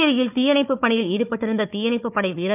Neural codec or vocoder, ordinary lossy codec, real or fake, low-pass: codec, 16 kHz, 4 kbps, FunCodec, trained on Chinese and English, 50 frames a second; none; fake; 3.6 kHz